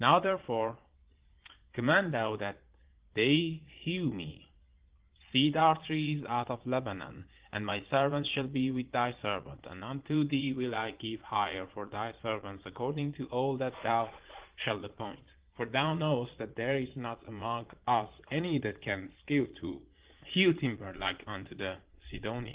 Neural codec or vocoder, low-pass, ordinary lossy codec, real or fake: vocoder, 22.05 kHz, 80 mel bands, Vocos; 3.6 kHz; Opus, 16 kbps; fake